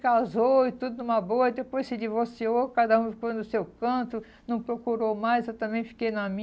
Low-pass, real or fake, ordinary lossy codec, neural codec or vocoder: none; real; none; none